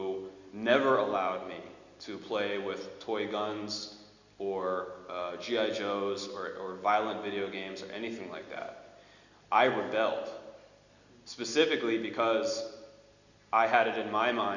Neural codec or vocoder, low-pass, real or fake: none; 7.2 kHz; real